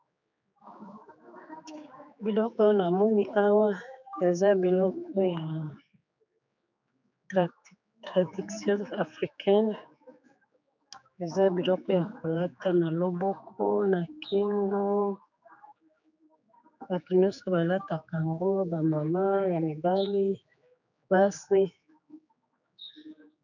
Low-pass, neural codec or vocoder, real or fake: 7.2 kHz; codec, 16 kHz, 4 kbps, X-Codec, HuBERT features, trained on general audio; fake